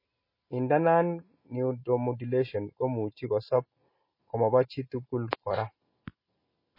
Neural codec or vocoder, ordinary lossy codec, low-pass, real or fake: none; MP3, 24 kbps; 5.4 kHz; real